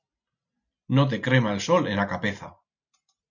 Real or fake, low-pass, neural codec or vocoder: real; 7.2 kHz; none